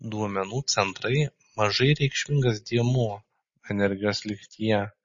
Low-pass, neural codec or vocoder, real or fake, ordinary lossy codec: 7.2 kHz; none; real; MP3, 32 kbps